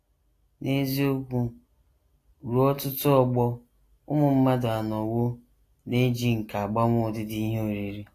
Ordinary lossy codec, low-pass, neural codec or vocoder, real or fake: AAC, 48 kbps; 14.4 kHz; none; real